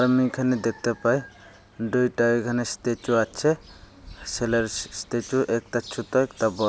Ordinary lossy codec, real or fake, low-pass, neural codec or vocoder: none; real; none; none